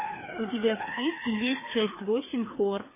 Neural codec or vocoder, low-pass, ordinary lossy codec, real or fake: codec, 16 kHz, 2 kbps, FreqCodec, larger model; 3.6 kHz; MP3, 16 kbps; fake